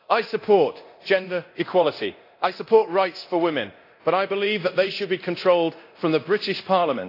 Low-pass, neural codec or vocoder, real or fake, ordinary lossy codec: 5.4 kHz; codec, 24 kHz, 0.9 kbps, DualCodec; fake; AAC, 32 kbps